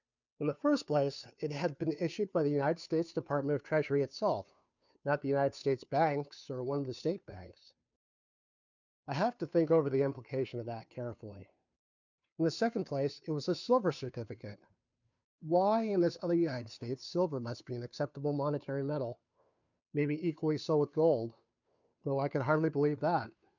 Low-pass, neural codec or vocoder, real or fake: 7.2 kHz; codec, 16 kHz, 2 kbps, FunCodec, trained on Chinese and English, 25 frames a second; fake